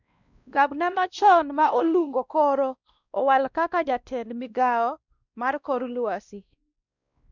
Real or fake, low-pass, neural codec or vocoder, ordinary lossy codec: fake; 7.2 kHz; codec, 16 kHz, 1 kbps, X-Codec, WavLM features, trained on Multilingual LibriSpeech; none